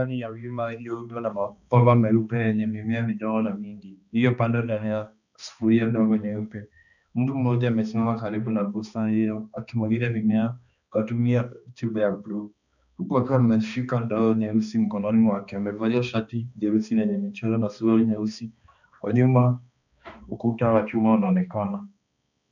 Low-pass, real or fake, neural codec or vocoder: 7.2 kHz; fake; codec, 16 kHz, 2 kbps, X-Codec, HuBERT features, trained on balanced general audio